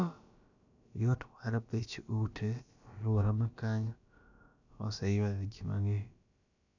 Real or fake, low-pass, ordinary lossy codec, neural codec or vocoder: fake; 7.2 kHz; none; codec, 16 kHz, about 1 kbps, DyCAST, with the encoder's durations